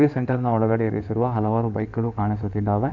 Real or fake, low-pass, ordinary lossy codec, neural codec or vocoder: fake; 7.2 kHz; none; codec, 16 kHz in and 24 kHz out, 2.2 kbps, FireRedTTS-2 codec